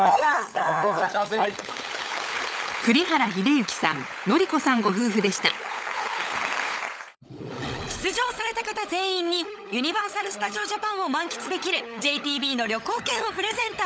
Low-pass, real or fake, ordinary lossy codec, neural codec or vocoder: none; fake; none; codec, 16 kHz, 16 kbps, FunCodec, trained on LibriTTS, 50 frames a second